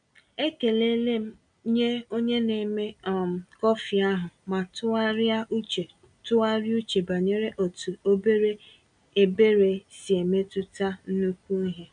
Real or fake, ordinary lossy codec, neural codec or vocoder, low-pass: real; none; none; 9.9 kHz